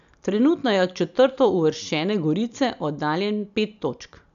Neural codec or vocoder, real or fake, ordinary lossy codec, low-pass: none; real; none; 7.2 kHz